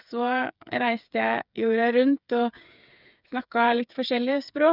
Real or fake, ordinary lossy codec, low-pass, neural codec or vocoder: fake; none; 5.4 kHz; codec, 16 kHz, 8 kbps, FreqCodec, smaller model